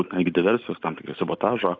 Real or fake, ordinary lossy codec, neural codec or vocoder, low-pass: real; AAC, 48 kbps; none; 7.2 kHz